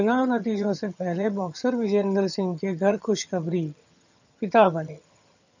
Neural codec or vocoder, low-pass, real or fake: vocoder, 22.05 kHz, 80 mel bands, HiFi-GAN; 7.2 kHz; fake